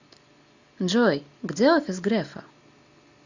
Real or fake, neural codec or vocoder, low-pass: real; none; 7.2 kHz